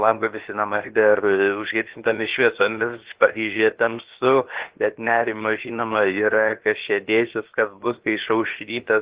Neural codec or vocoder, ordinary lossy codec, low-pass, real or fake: codec, 16 kHz, 0.7 kbps, FocalCodec; Opus, 16 kbps; 3.6 kHz; fake